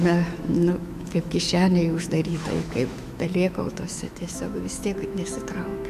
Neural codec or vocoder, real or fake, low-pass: autoencoder, 48 kHz, 128 numbers a frame, DAC-VAE, trained on Japanese speech; fake; 14.4 kHz